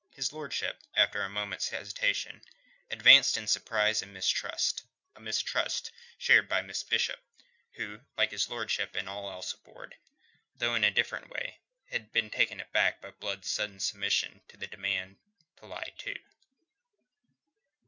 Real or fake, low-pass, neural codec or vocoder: real; 7.2 kHz; none